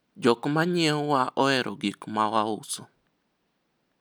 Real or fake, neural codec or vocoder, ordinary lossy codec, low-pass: real; none; none; none